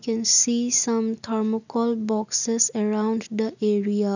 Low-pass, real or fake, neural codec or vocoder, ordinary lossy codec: 7.2 kHz; real; none; none